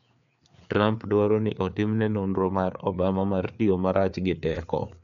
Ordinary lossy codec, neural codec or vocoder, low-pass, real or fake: MP3, 96 kbps; codec, 16 kHz, 4 kbps, FreqCodec, larger model; 7.2 kHz; fake